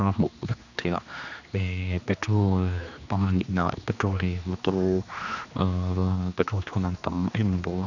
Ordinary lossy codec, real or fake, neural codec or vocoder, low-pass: none; fake; codec, 16 kHz, 2 kbps, X-Codec, HuBERT features, trained on general audio; 7.2 kHz